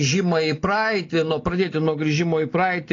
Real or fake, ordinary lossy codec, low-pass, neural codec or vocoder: real; AAC, 32 kbps; 7.2 kHz; none